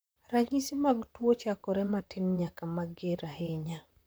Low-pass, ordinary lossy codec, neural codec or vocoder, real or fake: none; none; vocoder, 44.1 kHz, 128 mel bands, Pupu-Vocoder; fake